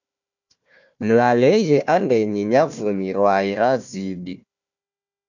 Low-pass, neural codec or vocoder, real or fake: 7.2 kHz; codec, 16 kHz, 1 kbps, FunCodec, trained on Chinese and English, 50 frames a second; fake